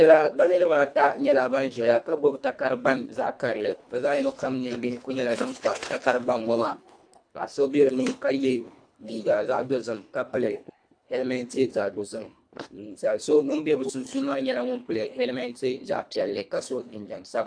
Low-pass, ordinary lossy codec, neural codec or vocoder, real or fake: 9.9 kHz; AAC, 64 kbps; codec, 24 kHz, 1.5 kbps, HILCodec; fake